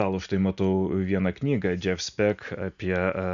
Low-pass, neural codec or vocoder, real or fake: 7.2 kHz; none; real